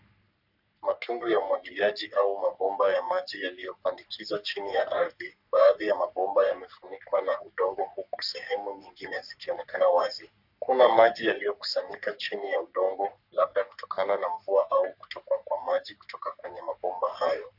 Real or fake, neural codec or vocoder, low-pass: fake; codec, 44.1 kHz, 3.4 kbps, Pupu-Codec; 5.4 kHz